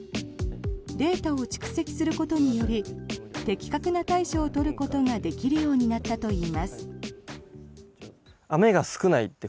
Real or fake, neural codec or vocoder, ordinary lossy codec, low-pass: real; none; none; none